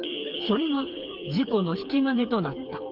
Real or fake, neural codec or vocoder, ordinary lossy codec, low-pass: fake; codec, 16 kHz, 4 kbps, FreqCodec, smaller model; Opus, 32 kbps; 5.4 kHz